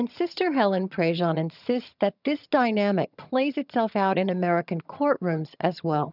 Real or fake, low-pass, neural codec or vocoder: fake; 5.4 kHz; vocoder, 22.05 kHz, 80 mel bands, HiFi-GAN